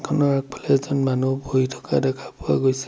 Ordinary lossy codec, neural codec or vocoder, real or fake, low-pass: none; none; real; none